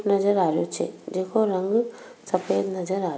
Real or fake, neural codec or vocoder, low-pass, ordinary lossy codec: real; none; none; none